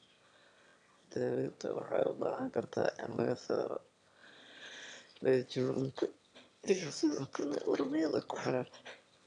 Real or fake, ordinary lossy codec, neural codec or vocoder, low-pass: fake; none; autoencoder, 22.05 kHz, a latent of 192 numbers a frame, VITS, trained on one speaker; 9.9 kHz